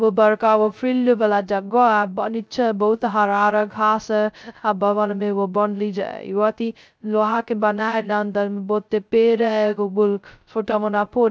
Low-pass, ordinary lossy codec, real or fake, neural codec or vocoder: none; none; fake; codec, 16 kHz, 0.2 kbps, FocalCodec